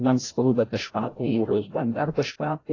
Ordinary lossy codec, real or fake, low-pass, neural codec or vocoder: AAC, 32 kbps; fake; 7.2 kHz; codec, 16 kHz, 0.5 kbps, FreqCodec, larger model